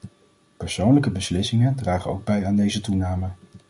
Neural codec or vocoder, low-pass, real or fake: none; 10.8 kHz; real